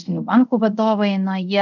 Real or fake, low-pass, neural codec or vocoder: fake; 7.2 kHz; codec, 24 kHz, 0.5 kbps, DualCodec